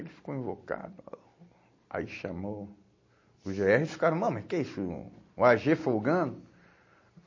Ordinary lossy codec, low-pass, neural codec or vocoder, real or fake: MP3, 32 kbps; 7.2 kHz; none; real